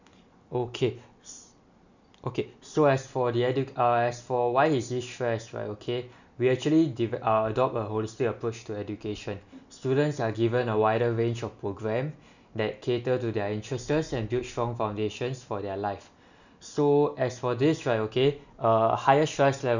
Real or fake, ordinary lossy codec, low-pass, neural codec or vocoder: real; none; 7.2 kHz; none